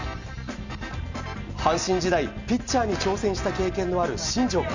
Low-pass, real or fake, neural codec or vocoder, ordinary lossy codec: 7.2 kHz; real; none; none